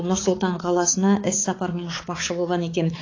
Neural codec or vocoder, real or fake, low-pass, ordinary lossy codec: codec, 16 kHz, 4 kbps, X-Codec, HuBERT features, trained on balanced general audio; fake; 7.2 kHz; AAC, 32 kbps